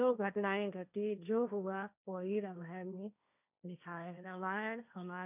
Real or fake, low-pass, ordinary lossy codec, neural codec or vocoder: fake; 3.6 kHz; none; codec, 16 kHz, 1.1 kbps, Voila-Tokenizer